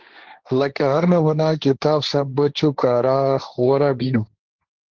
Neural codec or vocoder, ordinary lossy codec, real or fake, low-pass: codec, 16 kHz, 1.1 kbps, Voila-Tokenizer; Opus, 16 kbps; fake; 7.2 kHz